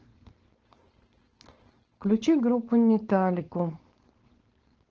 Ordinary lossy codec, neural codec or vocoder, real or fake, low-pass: Opus, 16 kbps; codec, 16 kHz, 4.8 kbps, FACodec; fake; 7.2 kHz